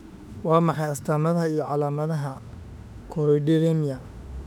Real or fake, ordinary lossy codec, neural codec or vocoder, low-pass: fake; none; autoencoder, 48 kHz, 32 numbers a frame, DAC-VAE, trained on Japanese speech; 19.8 kHz